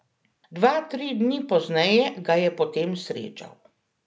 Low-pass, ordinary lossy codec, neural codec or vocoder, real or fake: none; none; none; real